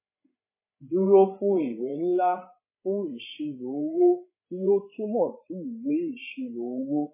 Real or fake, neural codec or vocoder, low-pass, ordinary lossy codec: fake; codec, 16 kHz, 16 kbps, FreqCodec, larger model; 3.6 kHz; MP3, 16 kbps